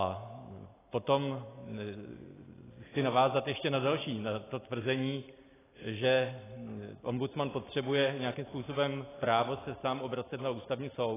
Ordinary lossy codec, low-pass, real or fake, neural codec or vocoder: AAC, 16 kbps; 3.6 kHz; real; none